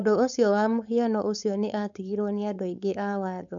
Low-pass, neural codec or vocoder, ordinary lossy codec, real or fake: 7.2 kHz; codec, 16 kHz, 4.8 kbps, FACodec; none; fake